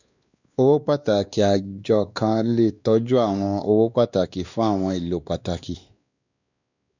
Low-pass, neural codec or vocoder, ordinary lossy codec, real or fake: 7.2 kHz; codec, 16 kHz, 2 kbps, X-Codec, WavLM features, trained on Multilingual LibriSpeech; none; fake